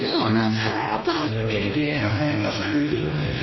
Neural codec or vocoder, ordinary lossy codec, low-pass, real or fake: codec, 16 kHz, 1 kbps, X-Codec, WavLM features, trained on Multilingual LibriSpeech; MP3, 24 kbps; 7.2 kHz; fake